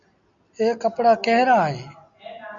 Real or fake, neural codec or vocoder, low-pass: real; none; 7.2 kHz